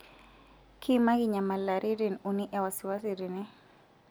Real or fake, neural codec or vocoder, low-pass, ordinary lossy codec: real; none; none; none